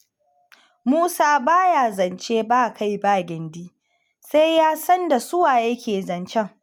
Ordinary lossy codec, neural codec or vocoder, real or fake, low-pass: none; none; real; none